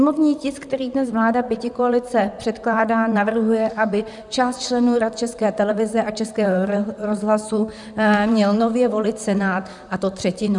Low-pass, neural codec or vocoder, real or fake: 10.8 kHz; vocoder, 44.1 kHz, 128 mel bands, Pupu-Vocoder; fake